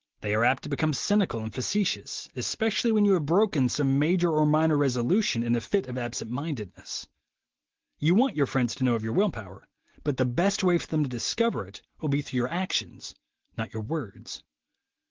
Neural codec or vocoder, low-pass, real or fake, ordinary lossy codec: none; 7.2 kHz; real; Opus, 16 kbps